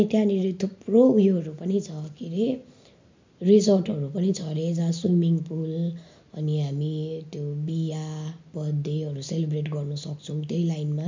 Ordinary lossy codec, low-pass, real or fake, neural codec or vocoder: MP3, 64 kbps; 7.2 kHz; real; none